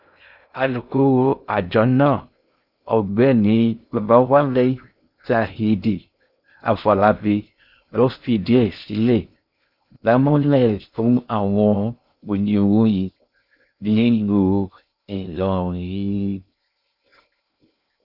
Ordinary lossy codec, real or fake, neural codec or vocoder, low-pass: none; fake; codec, 16 kHz in and 24 kHz out, 0.6 kbps, FocalCodec, streaming, 2048 codes; 5.4 kHz